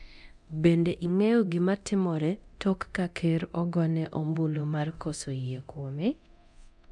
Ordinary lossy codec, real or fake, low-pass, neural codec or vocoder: none; fake; none; codec, 24 kHz, 0.9 kbps, DualCodec